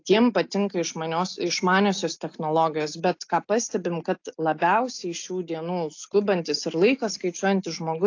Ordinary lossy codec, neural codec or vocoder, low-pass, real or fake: AAC, 48 kbps; none; 7.2 kHz; real